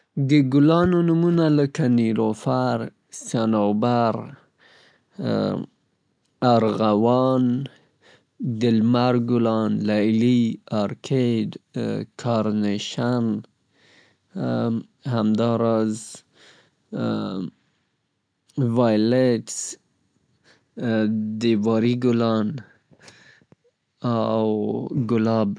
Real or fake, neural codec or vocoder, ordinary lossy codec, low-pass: real; none; none; 9.9 kHz